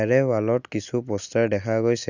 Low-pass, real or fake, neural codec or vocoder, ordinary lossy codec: 7.2 kHz; real; none; none